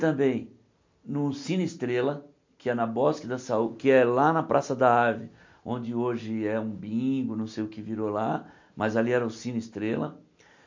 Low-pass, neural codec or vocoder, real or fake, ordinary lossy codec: 7.2 kHz; none; real; MP3, 48 kbps